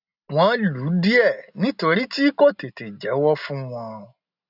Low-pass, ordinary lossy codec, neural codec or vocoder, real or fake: 5.4 kHz; none; none; real